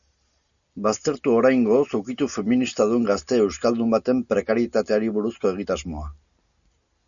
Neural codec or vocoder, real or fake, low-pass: none; real; 7.2 kHz